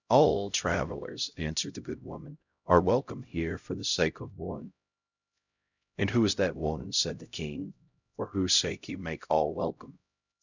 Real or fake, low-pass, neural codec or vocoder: fake; 7.2 kHz; codec, 16 kHz, 0.5 kbps, X-Codec, HuBERT features, trained on LibriSpeech